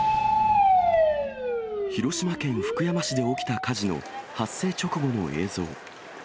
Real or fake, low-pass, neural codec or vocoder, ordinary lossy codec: real; none; none; none